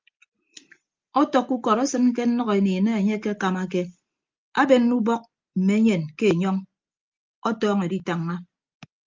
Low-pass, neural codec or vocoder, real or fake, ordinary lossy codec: 7.2 kHz; none; real; Opus, 24 kbps